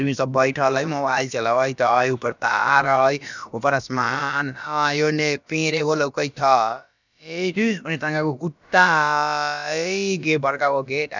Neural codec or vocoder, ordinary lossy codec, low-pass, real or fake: codec, 16 kHz, about 1 kbps, DyCAST, with the encoder's durations; none; 7.2 kHz; fake